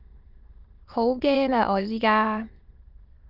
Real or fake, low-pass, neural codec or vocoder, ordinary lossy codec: fake; 5.4 kHz; autoencoder, 22.05 kHz, a latent of 192 numbers a frame, VITS, trained on many speakers; Opus, 24 kbps